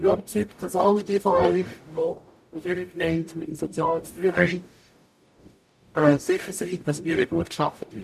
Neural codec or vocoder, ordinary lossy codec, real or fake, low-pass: codec, 44.1 kHz, 0.9 kbps, DAC; none; fake; 14.4 kHz